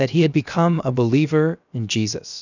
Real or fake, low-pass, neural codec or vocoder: fake; 7.2 kHz; codec, 16 kHz, about 1 kbps, DyCAST, with the encoder's durations